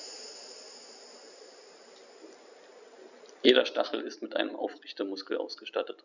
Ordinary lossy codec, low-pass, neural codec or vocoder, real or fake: none; 7.2 kHz; none; real